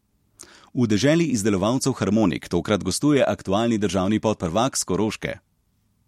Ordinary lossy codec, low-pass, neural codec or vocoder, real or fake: MP3, 64 kbps; 19.8 kHz; none; real